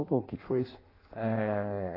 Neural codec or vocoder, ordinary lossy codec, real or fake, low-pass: codec, 16 kHz in and 24 kHz out, 0.6 kbps, FireRedTTS-2 codec; AAC, 24 kbps; fake; 5.4 kHz